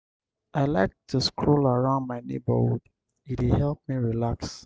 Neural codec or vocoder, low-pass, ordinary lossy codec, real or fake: none; none; none; real